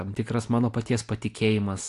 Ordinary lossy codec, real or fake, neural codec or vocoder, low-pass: AAC, 64 kbps; real; none; 10.8 kHz